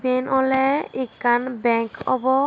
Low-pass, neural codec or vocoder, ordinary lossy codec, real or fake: none; none; none; real